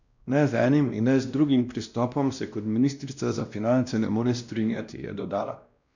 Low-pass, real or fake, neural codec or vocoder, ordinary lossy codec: 7.2 kHz; fake; codec, 16 kHz, 1 kbps, X-Codec, WavLM features, trained on Multilingual LibriSpeech; none